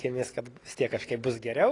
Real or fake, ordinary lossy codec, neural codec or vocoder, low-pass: real; AAC, 32 kbps; none; 10.8 kHz